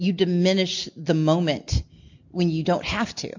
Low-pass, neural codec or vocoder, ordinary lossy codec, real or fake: 7.2 kHz; none; MP3, 48 kbps; real